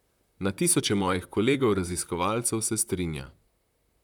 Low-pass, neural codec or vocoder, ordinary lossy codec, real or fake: 19.8 kHz; vocoder, 44.1 kHz, 128 mel bands, Pupu-Vocoder; none; fake